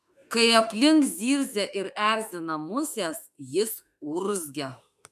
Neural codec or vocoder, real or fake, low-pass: autoencoder, 48 kHz, 32 numbers a frame, DAC-VAE, trained on Japanese speech; fake; 14.4 kHz